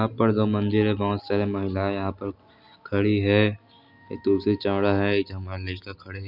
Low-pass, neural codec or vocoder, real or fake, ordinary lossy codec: 5.4 kHz; none; real; none